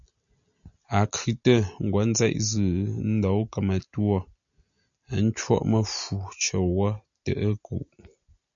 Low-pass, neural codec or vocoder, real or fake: 7.2 kHz; none; real